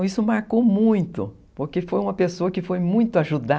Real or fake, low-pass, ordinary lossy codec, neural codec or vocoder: real; none; none; none